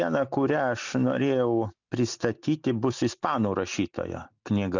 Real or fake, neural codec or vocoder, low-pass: real; none; 7.2 kHz